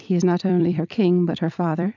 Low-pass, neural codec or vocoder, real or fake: 7.2 kHz; vocoder, 44.1 kHz, 128 mel bands every 256 samples, BigVGAN v2; fake